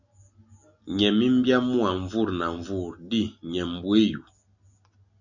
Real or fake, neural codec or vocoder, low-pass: real; none; 7.2 kHz